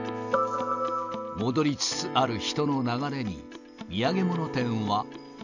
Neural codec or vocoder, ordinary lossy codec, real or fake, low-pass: none; none; real; 7.2 kHz